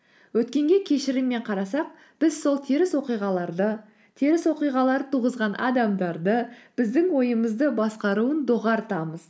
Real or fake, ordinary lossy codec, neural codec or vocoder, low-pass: real; none; none; none